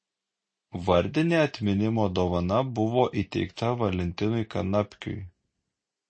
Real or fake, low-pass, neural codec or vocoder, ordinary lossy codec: real; 9.9 kHz; none; MP3, 32 kbps